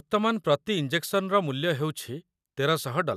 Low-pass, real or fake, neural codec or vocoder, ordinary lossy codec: 14.4 kHz; real; none; none